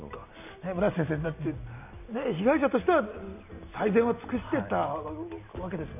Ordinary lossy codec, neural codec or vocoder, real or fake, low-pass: none; none; real; 3.6 kHz